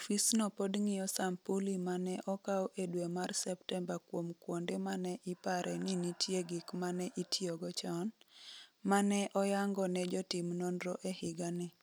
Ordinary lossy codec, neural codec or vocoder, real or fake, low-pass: none; none; real; none